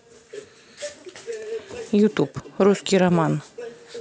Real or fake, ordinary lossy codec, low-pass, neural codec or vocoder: real; none; none; none